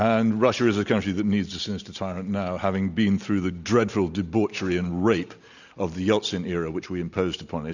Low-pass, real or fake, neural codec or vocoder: 7.2 kHz; real; none